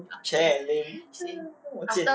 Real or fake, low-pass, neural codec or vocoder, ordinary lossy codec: real; none; none; none